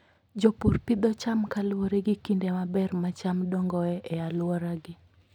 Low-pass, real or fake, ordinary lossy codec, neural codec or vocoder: 19.8 kHz; real; none; none